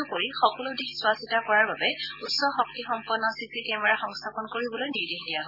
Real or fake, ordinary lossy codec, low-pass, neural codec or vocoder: real; none; 5.4 kHz; none